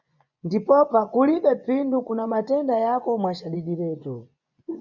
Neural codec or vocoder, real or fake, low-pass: vocoder, 24 kHz, 100 mel bands, Vocos; fake; 7.2 kHz